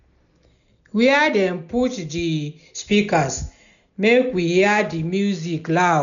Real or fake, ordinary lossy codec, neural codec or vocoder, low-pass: real; none; none; 7.2 kHz